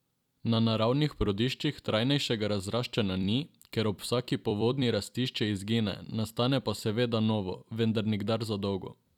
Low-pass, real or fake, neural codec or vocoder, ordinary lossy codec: 19.8 kHz; fake; vocoder, 44.1 kHz, 128 mel bands every 256 samples, BigVGAN v2; none